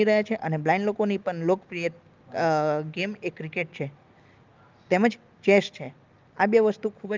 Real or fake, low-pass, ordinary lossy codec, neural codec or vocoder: real; 7.2 kHz; Opus, 32 kbps; none